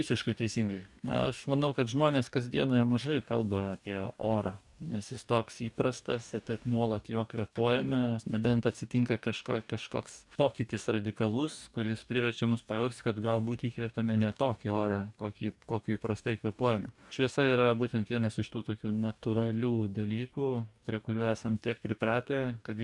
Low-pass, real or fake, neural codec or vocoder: 10.8 kHz; fake; codec, 44.1 kHz, 2.6 kbps, DAC